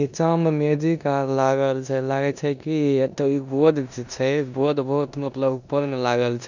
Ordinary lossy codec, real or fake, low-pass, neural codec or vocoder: none; fake; 7.2 kHz; codec, 16 kHz in and 24 kHz out, 0.9 kbps, LongCat-Audio-Codec, four codebook decoder